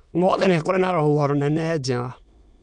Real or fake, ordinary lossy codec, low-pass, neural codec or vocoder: fake; none; 9.9 kHz; autoencoder, 22.05 kHz, a latent of 192 numbers a frame, VITS, trained on many speakers